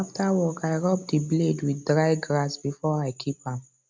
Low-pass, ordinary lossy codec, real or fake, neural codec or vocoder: none; none; real; none